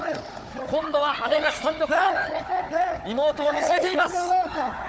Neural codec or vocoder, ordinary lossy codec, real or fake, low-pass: codec, 16 kHz, 4 kbps, FunCodec, trained on Chinese and English, 50 frames a second; none; fake; none